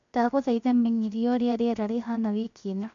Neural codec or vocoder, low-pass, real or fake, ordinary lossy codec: codec, 16 kHz, 0.7 kbps, FocalCodec; 7.2 kHz; fake; none